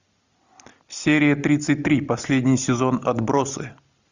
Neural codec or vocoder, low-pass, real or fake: none; 7.2 kHz; real